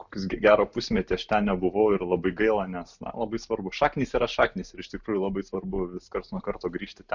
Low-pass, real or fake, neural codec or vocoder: 7.2 kHz; real; none